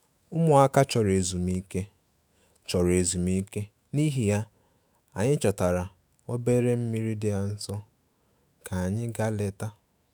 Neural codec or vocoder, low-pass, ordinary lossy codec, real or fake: autoencoder, 48 kHz, 128 numbers a frame, DAC-VAE, trained on Japanese speech; none; none; fake